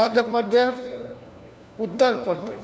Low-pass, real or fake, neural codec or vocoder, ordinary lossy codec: none; fake; codec, 16 kHz, 1 kbps, FunCodec, trained on LibriTTS, 50 frames a second; none